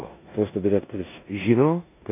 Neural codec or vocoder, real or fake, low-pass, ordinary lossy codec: codec, 16 kHz in and 24 kHz out, 0.9 kbps, LongCat-Audio-Codec, four codebook decoder; fake; 3.6 kHz; AAC, 24 kbps